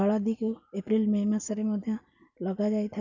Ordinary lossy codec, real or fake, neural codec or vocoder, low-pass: none; real; none; 7.2 kHz